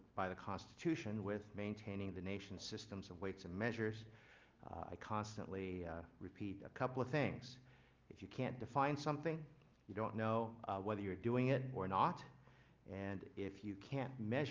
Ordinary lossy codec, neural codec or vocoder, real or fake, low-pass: Opus, 24 kbps; none; real; 7.2 kHz